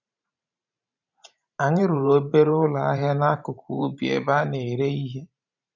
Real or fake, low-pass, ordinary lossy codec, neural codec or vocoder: fake; 7.2 kHz; none; vocoder, 44.1 kHz, 128 mel bands every 512 samples, BigVGAN v2